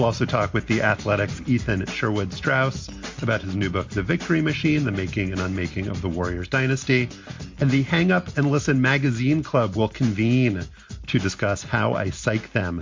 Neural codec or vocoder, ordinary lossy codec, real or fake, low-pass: none; MP3, 48 kbps; real; 7.2 kHz